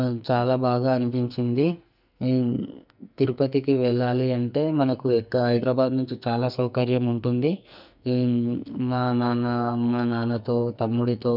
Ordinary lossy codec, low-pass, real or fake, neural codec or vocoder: none; 5.4 kHz; fake; codec, 44.1 kHz, 2.6 kbps, SNAC